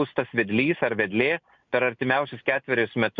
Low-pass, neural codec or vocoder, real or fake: 7.2 kHz; none; real